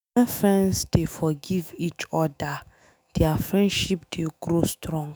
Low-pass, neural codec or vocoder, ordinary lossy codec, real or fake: none; autoencoder, 48 kHz, 128 numbers a frame, DAC-VAE, trained on Japanese speech; none; fake